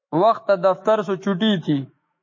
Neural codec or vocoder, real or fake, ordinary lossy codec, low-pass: autoencoder, 48 kHz, 128 numbers a frame, DAC-VAE, trained on Japanese speech; fake; MP3, 32 kbps; 7.2 kHz